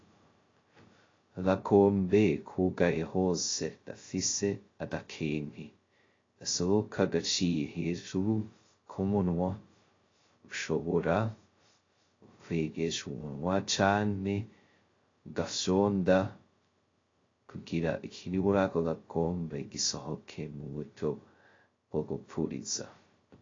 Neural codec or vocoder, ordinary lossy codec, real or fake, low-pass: codec, 16 kHz, 0.2 kbps, FocalCodec; AAC, 32 kbps; fake; 7.2 kHz